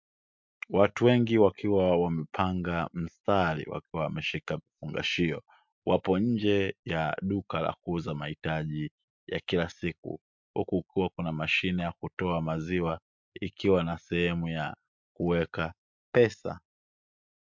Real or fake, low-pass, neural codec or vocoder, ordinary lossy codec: real; 7.2 kHz; none; MP3, 64 kbps